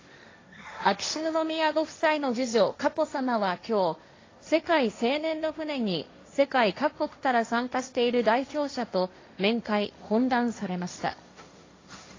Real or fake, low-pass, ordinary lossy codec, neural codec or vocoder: fake; 7.2 kHz; AAC, 32 kbps; codec, 16 kHz, 1.1 kbps, Voila-Tokenizer